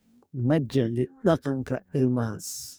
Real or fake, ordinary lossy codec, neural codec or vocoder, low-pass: fake; none; codec, 44.1 kHz, 2.6 kbps, DAC; none